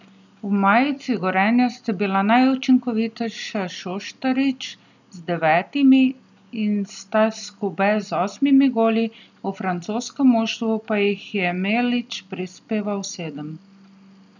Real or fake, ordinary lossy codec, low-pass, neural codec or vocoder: real; none; 7.2 kHz; none